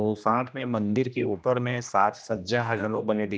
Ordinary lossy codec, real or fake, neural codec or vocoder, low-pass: none; fake; codec, 16 kHz, 1 kbps, X-Codec, HuBERT features, trained on general audio; none